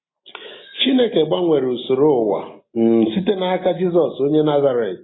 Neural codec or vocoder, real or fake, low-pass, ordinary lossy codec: none; real; 7.2 kHz; AAC, 16 kbps